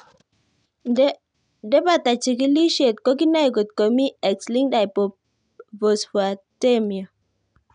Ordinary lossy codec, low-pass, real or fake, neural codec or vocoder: none; 9.9 kHz; real; none